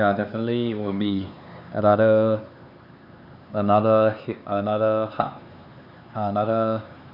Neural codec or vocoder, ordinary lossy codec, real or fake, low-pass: codec, 16 kHz, 4 kbps, X-Codec, HuBERT features, trained on LibriSpeech; none; fake; 5.4 kHz